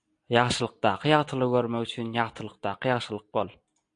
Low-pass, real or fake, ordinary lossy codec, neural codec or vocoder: 9.9 kHz; real; AAC, 64 kbps; none